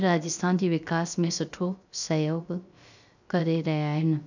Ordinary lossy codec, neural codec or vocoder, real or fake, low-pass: none; codec, 16 kHz, 0.3 kbps, FocalCodec; fake; 7.2 kHz